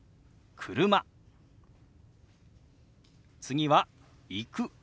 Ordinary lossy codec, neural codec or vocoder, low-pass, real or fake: none; none; none; real